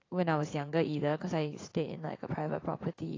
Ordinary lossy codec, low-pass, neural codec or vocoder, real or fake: AAC, 32 kbps; 7.2 kHz; vocoder, 44.1 kHz, 80 mel bands, Vocos; fake